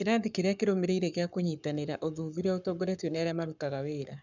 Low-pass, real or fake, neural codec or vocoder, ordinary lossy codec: 7.2 kHz; fake; codec, 44.1 kHz, 7.8 kbps, Pupu-Codec; none